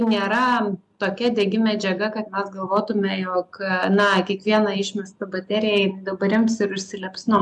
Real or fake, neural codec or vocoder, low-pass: real; none; 10.8 kHz